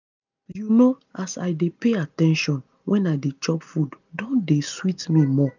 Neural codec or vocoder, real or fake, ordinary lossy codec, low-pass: none; real; none; 7.2 kHz